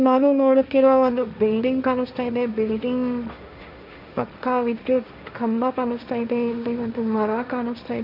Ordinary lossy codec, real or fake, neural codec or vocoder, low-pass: none; fake; codec, 16 kHz, 1.1 kbps, Voila-Tokenizer; 5.4 kHz